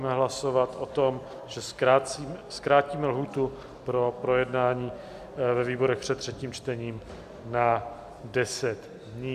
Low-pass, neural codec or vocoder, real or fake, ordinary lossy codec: 14.4 kHz; none; real; AAC, 96 kbps